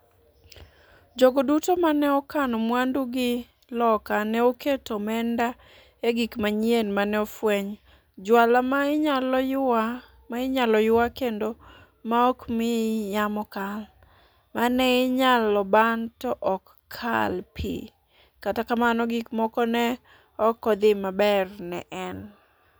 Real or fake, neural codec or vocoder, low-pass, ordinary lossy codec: real; none; none; none